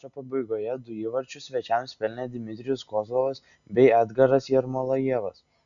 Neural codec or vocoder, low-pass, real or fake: none; 7.2 kHz; real